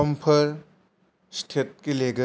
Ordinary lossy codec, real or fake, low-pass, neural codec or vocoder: none; real; none; none